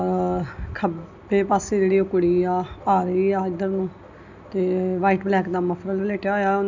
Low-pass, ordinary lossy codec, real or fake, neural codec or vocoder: 7.2 kHz; none; real; none